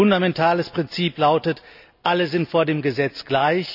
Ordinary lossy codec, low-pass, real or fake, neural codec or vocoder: none; 5.4 kHz; real; none